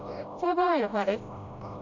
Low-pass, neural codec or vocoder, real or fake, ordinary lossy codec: 7.2 kHz; codec, 16 kHz, 0.5 kbps, FreqCodec, smaller model; fake; none